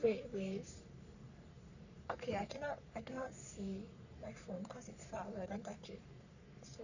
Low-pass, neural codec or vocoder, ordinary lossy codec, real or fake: 7.2 kHz; codec, 44.1 kHz, 3.4 kbps, Pupu-Codec; none; fake